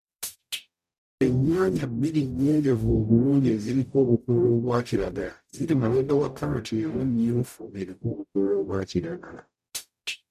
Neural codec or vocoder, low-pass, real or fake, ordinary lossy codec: codec, 44.1 kHz, 0.9 kbps, DAC; 14.4 kHz; fake; none